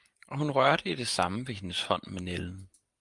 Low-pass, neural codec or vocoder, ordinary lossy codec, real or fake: 10.8 kHz; vocoder, 44.1 kHz, 128 mel bands every 512 samples, BigVGAN v2; Opus, 32 kbps; fake